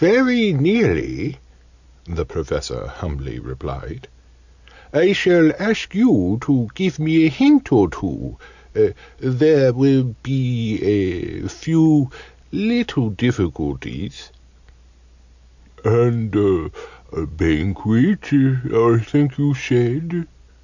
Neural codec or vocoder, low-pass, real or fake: none; 7.2 kHz; real